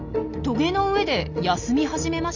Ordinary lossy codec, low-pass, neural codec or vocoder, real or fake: none; 7.2 kHz; none; real